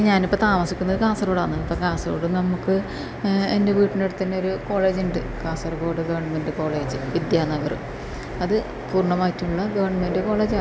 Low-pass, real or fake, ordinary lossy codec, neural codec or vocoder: none; real; none; none